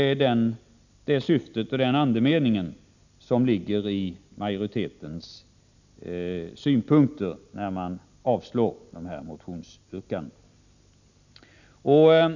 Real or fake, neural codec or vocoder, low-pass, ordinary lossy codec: real; none; 7.2 kHz; none